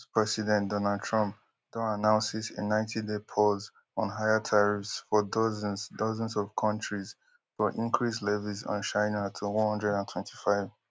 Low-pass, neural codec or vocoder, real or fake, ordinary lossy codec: none; none; real; none